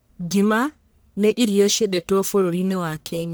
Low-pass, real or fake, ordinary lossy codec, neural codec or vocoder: none; fake; none; codec, 44.1 kHz, 1.7 kbps, Pupu-Codec